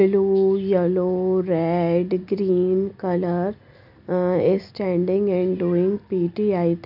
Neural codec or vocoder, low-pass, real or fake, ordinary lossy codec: none; 5.4 kHz; real; none